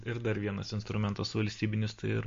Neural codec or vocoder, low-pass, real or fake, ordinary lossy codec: none; 7.2 kHz; real; MP3, 48 kbps